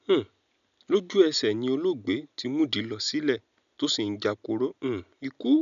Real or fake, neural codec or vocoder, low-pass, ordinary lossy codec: real; none; 7.2 kHz; MP3, 96 kbps